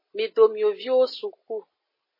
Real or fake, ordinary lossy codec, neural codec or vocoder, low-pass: real; MP3, 24 kbps; none; 5.4 kHz